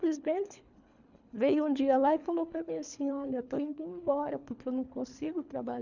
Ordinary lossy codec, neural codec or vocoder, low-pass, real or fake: none; codec, 24 kHz, 3 kbps, HILCodec; 7.2 kHz; fake